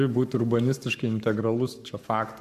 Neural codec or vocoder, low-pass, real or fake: codec, 44.1 kHz, 7.8 kbps, Pupu-Codec; 14.4 kHz; fake